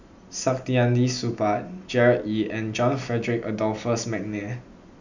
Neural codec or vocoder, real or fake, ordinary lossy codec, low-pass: none; real; none; 7.2 kHz